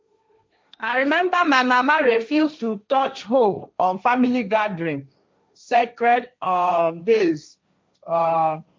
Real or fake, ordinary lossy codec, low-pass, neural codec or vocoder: fake; none; none; codec, 16 kHz, 1.1 kbps, Voila-Tokenizer